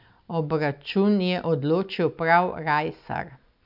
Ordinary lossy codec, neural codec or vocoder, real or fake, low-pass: none; none; real; 5.4 kHz